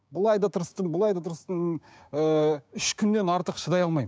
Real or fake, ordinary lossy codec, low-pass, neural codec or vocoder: fake; none; none; codec, 16 kHz, 6 kbps, DAC